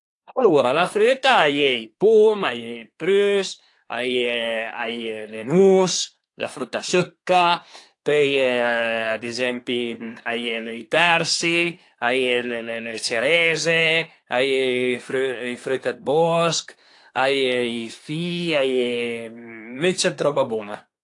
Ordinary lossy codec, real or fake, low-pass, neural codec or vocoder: AAC, 48 kbps; fake; 10.8 kHz; codec, 24 kHz, 1 kbps, SNAC